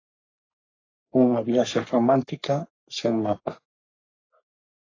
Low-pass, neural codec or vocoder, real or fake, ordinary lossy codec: 7.2 kHz; codec, 44.1 kHz, 3.4 kbps, Pupu-Codec; fake; AAC, 32 kbps